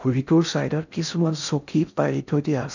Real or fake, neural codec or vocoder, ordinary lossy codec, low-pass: fake; codec, 16 kHz in and 24 kHz out, 0.6 kbps, FocalCodec, streaming, 2048 codes; none; 7.2 kHz